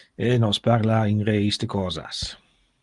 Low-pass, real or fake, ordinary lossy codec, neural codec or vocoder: 10.8 kHz; real; Opus, 32 kbps; none